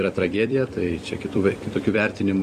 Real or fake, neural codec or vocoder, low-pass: fake; vocoder, 44.1 kHz, 128 mel bands every 256 samples, BigVGAN v2; 14.4 kHz